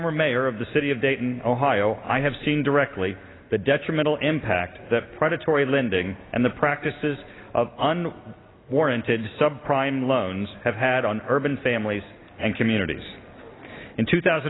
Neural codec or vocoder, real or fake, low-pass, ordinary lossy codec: none; real; 7.2 kHz; AAC, 16 kbps